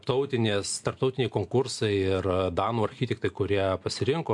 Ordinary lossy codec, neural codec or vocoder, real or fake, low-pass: MP3, 64 kbps; none; real; 10.8 kHz